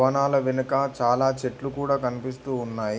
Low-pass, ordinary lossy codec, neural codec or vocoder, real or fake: none; none; none; real